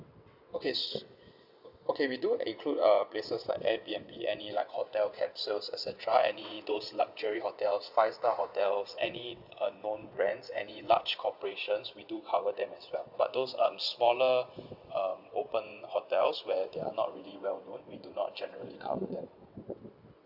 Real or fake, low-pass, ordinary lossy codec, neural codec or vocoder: fake; 5.4 kHz; Opus, 64 kbps; vocoder, 22.05 kHz, 80 mel bands, WaveNeXt